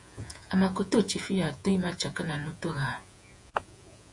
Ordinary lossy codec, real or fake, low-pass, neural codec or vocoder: AAC, 64 kbps; fake; 10.8 kHz; vocoder, 48 kHz, 128 mel bands, Vocos